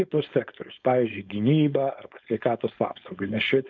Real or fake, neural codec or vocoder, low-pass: fake; codec, 16 kHz, 4.8 kbps, FACodec; 7.2 kHz